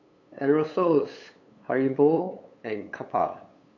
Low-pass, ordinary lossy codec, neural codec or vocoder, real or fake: 7.2 kHz; none; codec, 16 kHz, 8 kbps, FunCodec, trained on LibriTTS, 25 frames a second; fake